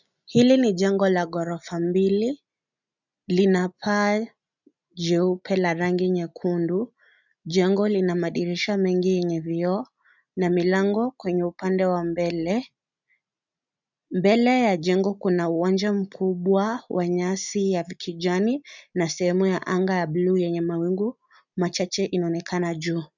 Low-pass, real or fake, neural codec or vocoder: 7.2 kHz; real; none